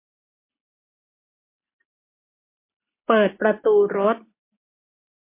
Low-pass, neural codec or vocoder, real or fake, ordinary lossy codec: 3.6 kHz; none; real; MP3, 24 kbps